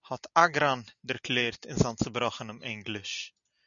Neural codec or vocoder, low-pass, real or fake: none; 7.2 kHz; real